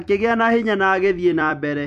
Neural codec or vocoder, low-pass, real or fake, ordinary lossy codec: vocoder, 44.1 kHz, 128 mel bands every 256 samples, BigVGAN v2; 14.4 kHz; fake; none